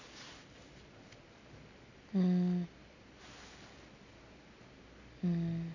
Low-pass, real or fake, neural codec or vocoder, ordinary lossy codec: 7.2 kHz; real; none; none